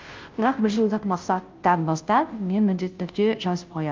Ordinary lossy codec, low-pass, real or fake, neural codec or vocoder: Opus, 24 kbps; 7.2 kHz; fake; codec, 16 kHz, 0.5 kbps, FunCodec, trained on Chinese and English, 25 frames a second